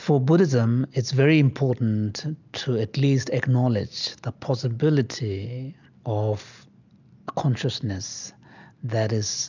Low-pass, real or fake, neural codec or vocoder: 7.2 kHz; real; none